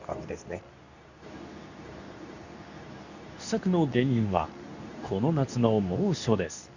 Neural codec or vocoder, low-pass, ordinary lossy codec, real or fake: codec, 24 kHz, 0.9 kbps, WavTokenizer, medium speech release version 2; 7.2 kHz; none; fake